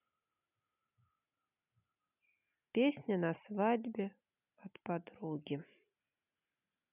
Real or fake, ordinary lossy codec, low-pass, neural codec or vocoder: real; none; 3.6 kHz; none